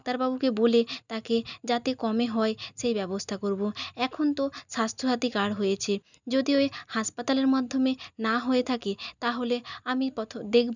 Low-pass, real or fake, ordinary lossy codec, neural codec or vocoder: 7.2 kHz; real; none; none